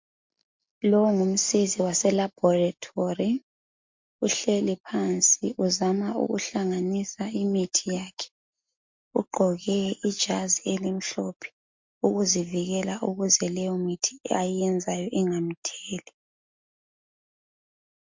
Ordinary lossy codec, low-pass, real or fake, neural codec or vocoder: MP3, 48 kbps; 7.2 kHz; real; none